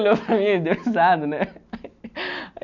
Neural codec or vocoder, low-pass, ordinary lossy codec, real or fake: none; 7.2 kHz; none; real